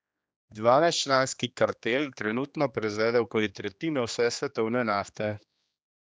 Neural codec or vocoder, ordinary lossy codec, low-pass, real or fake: codec, 16 kHz, 2 kbps, X-Codec, HuBERT features, trained on general audio; none; none; fake